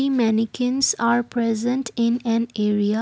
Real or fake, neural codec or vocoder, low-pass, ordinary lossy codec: real; none; none; none